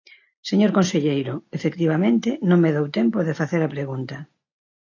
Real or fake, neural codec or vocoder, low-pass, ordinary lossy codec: real; none; 7.2 kHz; AAC, 48 kbps